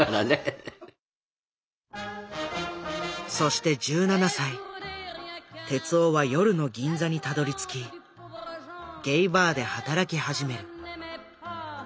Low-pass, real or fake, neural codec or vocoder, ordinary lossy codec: none; real; none; none